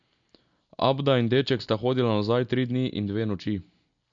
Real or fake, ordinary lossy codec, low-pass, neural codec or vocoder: real; MP3, 64 kbps; 7.2 kHz; none